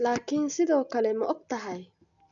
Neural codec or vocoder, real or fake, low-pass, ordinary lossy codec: none; real; 7.2 kHz; none